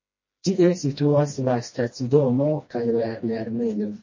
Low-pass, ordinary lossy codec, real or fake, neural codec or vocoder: 7.2 kHz; MP3, 32 kbps; fake; codec, 16 kHz, 1 kbps, FreqCodec, smaller model